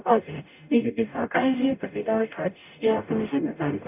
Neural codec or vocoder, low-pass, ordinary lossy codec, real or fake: codec, 44.1 kHz, 0.9 kbps, DAC; 3.6 kHz; none; fake